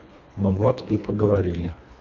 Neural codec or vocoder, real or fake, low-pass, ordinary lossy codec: codec, 24 kHz, 1.5 kbps, HILCodec; fake; 7.2 kHz; AAC, 48 kbps